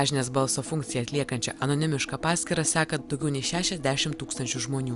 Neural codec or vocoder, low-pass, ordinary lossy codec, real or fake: none; 10.8 kHz; Opus, 64 kbps; real